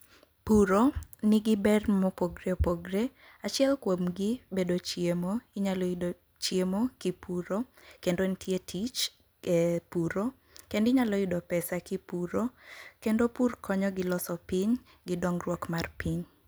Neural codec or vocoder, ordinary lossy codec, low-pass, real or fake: none; none; none; real